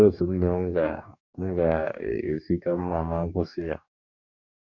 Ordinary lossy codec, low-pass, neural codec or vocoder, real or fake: none; 7.2 kHz; codec, 44.1 kHz, 2.6 kbps, DAC; fake